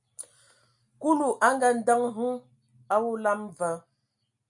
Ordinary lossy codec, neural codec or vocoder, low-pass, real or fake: MP3, 96 kbps; none; 10.8 kHz; real